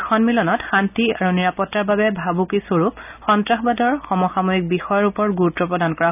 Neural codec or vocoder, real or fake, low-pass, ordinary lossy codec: none; real; 3.6 kHz; none